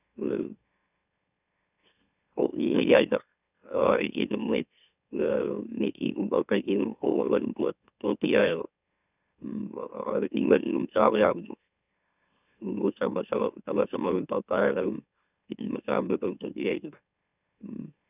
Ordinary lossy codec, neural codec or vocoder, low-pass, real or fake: none; autoencoder, 44.1 kHz, a latent of 192 numbers a frame, MeloTTS; 3.6 kHz; fake